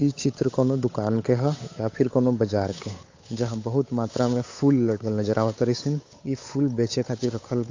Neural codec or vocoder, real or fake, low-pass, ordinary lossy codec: codec, 16 kHz, 8 kbps, FunCodec, trained on Chinese and English, 25 frames a second; fake; 7.2 kHz; AAC, 48 kbps